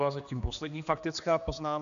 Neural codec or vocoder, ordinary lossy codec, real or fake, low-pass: codec, 16 kHz, 2 kbps, X-Codec, HuBERT features, trained on general audio; MP3, 96 kbps; fake; 7.2 kHz